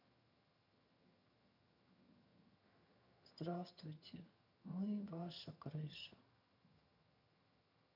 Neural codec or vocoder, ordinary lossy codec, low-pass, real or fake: vocoder, 22.05 kHz, 80 mel bands, HiFi-GAN; none; 5.4 kHz; fake